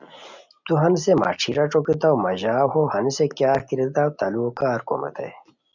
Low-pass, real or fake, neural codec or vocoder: 7.2 kHz; real; none